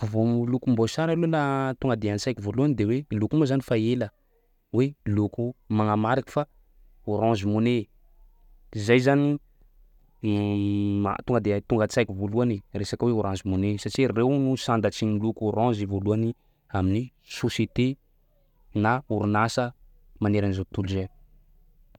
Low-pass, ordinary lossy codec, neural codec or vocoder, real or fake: 19.8 kHz; none; none; real